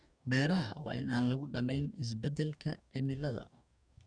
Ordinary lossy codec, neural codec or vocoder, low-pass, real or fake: none; codec, 44.1 kHz, 2.6 kbps, DAC; 9.9 kHz; fake